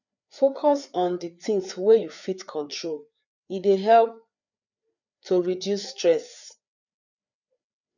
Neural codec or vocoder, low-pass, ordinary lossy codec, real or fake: codec, 16 kHz, 4 kbps, FreqCodec, larger model; 7.2 kHz; none; fake